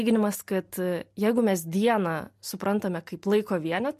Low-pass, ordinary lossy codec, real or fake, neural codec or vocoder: 14.4 kHz; MP3, 64 kbps; real; none